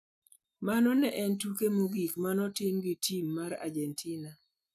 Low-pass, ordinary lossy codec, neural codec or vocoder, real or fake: 14.4 kHz; none; none; real